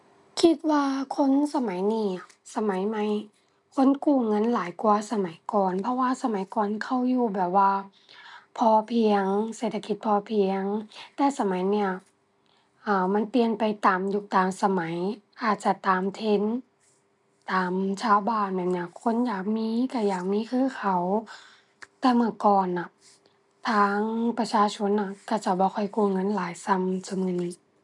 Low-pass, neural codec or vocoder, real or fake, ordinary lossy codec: 10.8 kHz; none; real; none